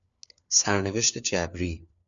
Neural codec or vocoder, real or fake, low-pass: codec, 16 kHz, 4 kbps, FunCodec, trained on LibriTTS, 50 frames a second; fake; 7.2 kHz